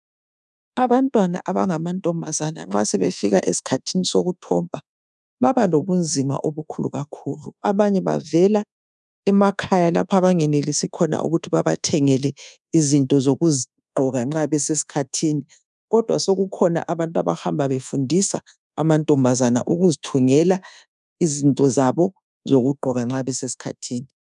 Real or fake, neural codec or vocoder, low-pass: fake; codec, 24 kHz, 1.2 kbps, DualCodec; 10.8 kHz